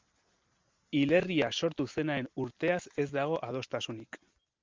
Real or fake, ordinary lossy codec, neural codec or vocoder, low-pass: real; Opus, 32 kbps; none; 7.2 kHz